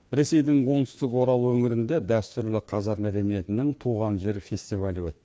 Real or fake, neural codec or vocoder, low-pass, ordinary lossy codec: fake; codec, 16 kHz, 2 kbps, FreqCodec, larger model; none; none